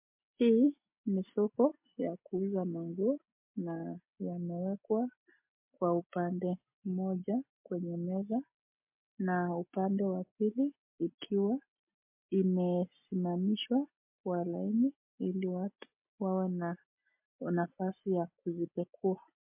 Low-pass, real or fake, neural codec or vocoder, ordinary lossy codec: 3.6 kHz; real; none; MP3, 32 kbps